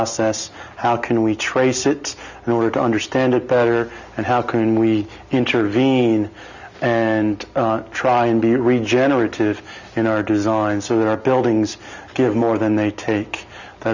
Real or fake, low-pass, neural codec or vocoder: real; 7.2 kHz; none